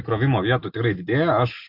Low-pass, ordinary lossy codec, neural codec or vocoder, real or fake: 5.4 kHz; MP3, 48 kbps; none; real